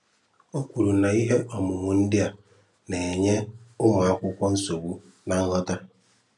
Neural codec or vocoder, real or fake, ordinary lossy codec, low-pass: none; real; none; 10.8 kHz